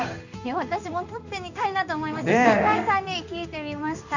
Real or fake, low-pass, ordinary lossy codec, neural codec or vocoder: fake; 7.2 kHz; none; codec, 16 kHz in and 24 kHz out, 1 kbps, XY-Tokenizer